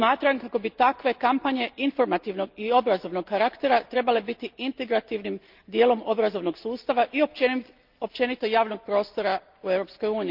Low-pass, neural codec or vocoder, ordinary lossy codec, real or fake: 5.4 kHz; none; Opus, 32 kbps; real